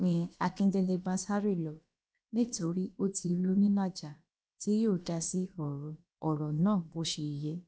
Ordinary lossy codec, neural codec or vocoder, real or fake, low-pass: none; codec, 16 kHz, about 1 kbps, DyCAST, with the encoder's durations; fake; none